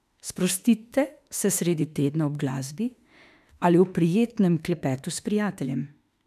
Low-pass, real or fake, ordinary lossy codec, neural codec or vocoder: 14.4 kHz; fake; none; autoencoder, 48 kHz, 32 numbers a frame, DAC-VAE, trained on Japanese speech